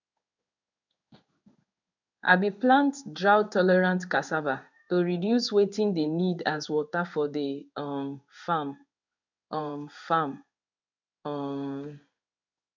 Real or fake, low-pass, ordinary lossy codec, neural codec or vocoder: fake; 7.2 kHz; none; codec, 16 kHz in and 24 kHz out, 1 kbps, XY-Tokenizer